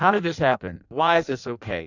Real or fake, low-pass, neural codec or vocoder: fake; 7.2 kHz; codec, 16 kHz in and 24 kHz out, 0.6 kbps, FireRedTTS-2 codec